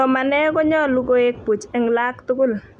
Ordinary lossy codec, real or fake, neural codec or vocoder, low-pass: none; real; none; none